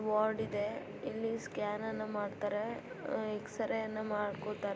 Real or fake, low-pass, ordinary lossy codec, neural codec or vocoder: real; none; none; none